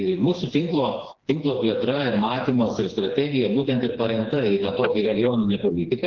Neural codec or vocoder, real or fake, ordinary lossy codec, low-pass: codec, 16 kHz, 2 kbps, FreqCodec, smaller model; fake; Opus, 16 kbps; 7.2 kHz